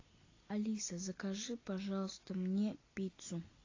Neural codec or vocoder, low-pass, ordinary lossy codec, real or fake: none; 7.2 kHz; AAC, 32 kbps; real